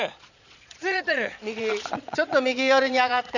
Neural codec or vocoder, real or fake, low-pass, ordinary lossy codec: vocoder, 22.05 kHz, 80 mel bands, Vocos; fake; 7.2 kHz; none